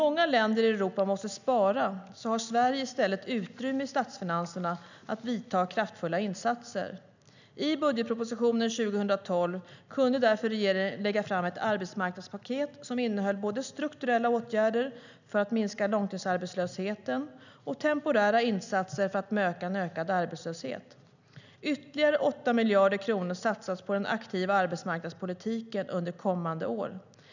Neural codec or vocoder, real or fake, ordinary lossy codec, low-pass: none; real; none; 7.2 kHz